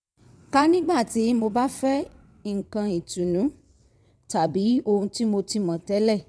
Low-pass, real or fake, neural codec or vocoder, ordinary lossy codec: none; fake; vocoder, 22.05 kHz, 80 mel bands, WaveNeXt; none